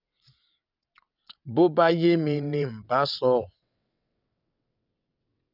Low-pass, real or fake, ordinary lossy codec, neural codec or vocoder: 5.4 kHz; fake; none; vocoder, 22.05 kHz, 80 mel bands, WaveNeXt